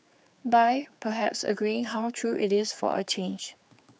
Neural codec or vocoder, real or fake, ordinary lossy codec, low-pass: codec, 16 kHz, 4 kbps, X-Codec, HuBERT features, trained on general audio; fake; none; none